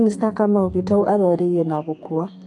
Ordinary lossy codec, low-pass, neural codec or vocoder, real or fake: AAC, 48 kbps; 10.8 kHz; codec, 32 kHz, 1.9 kbps, SNAC; fake